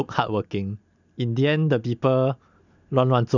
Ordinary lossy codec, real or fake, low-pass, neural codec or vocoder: none; real; 7.2 kHz; none